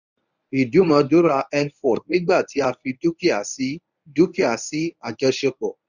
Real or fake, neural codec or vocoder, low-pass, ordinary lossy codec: fake; codec, 24 kHz, 0.9 kbps, WavTokenizer, medium speech release version 1; 7.2 kHz; none